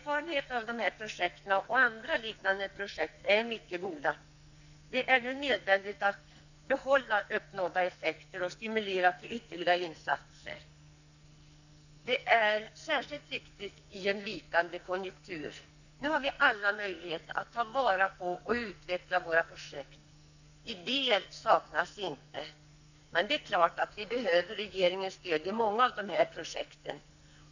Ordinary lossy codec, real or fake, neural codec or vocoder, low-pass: none; fake; codec, 44.1 kHz, 2.6 kbps, SNAC; 7.2 kHz